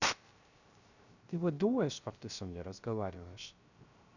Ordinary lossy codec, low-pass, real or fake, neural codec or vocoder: none; 7.2 kHz; fake; codec, 16 kHz, 0.3 kbps, FocalCodec